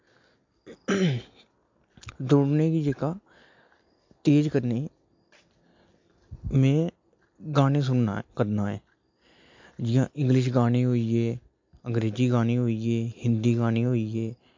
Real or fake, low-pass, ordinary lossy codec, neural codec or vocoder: real; 7.2 kHz; MP3, 48 kbps; none